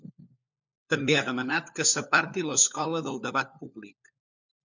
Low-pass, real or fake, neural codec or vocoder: 7.2 kHz; fake; codec, 16 kHz, 4 kbps, FunCodec, trained on LibriTTS, 50 frames a second